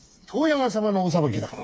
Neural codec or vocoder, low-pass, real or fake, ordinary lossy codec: codec, 16 kHz, 4 kbps, FreqCodec, smaller model; none; fake; none